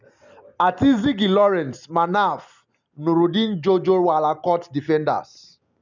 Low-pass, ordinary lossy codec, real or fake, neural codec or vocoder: 7.2 kHz; none; real; none